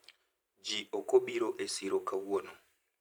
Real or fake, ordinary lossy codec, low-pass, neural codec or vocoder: fake; none; none; vocoder, 44.1 kHz, 128 mel bands every 512 samples, BigVGAN v2